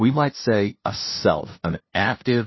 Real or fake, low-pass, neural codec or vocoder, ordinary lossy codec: fake; 7.2 kHz; codec, 16 kHz, 0.5 kbps, FunCodec, trained on LibriTTS, 25 frames a second; MP3, 24 kbps